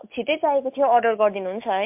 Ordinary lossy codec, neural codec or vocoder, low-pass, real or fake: MP3, 32 kbps; none; 3.6 kHz; real